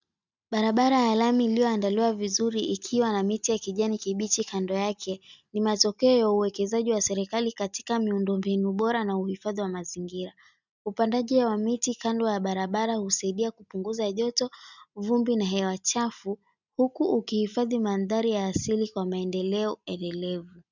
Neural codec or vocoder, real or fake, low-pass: none; real; 7.2 kHz